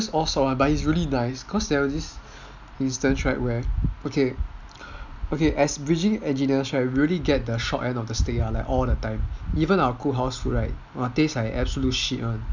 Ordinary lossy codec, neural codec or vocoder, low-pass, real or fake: none; none; 7.2 kHz; real